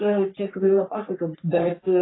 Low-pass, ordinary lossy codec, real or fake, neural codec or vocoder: 7.2 kHz; AAC, 16 kbps; fake; codec, 44.1 kHz, 3.4 kbps, Pupu-Codec